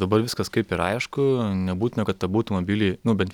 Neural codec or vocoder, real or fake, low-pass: none; real; 19.8 kHz